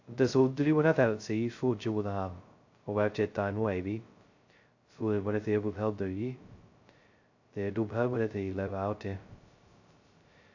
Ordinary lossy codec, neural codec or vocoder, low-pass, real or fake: AAC, 48 kbps; codec, 16 kHz, 0.2 kbps, FocalCodec; 7.2 kHz; fake